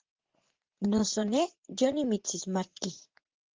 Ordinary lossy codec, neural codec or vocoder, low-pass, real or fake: Opus, 16 kbps; vocoder, 44.1 kHz, 80 mel bands, Vocos; 7.2 kHz; fake